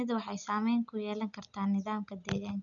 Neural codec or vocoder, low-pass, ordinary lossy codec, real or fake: none; 7.2 kHz; none; real